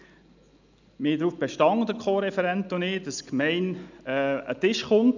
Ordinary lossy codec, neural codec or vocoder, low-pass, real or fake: none; none; 7.2 kHz; real